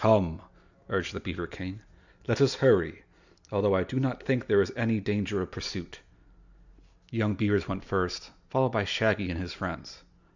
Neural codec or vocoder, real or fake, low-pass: none; real; 7.2 kHz